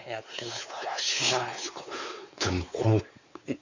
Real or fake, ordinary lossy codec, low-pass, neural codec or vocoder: fake; Opus, 64 kbps; 7.2 kHz; codec, 16 kHz, 4 kbps, X-Codec, WavLM features, trained on Multilingual LibriSpeech